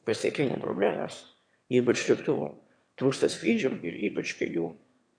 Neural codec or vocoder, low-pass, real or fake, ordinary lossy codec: autoencoder, 22.05 kHz, a latent of 192 numbers a frame, VITS, trained on one speaker; 9.9 kHz; fake; MP3, 64 kbps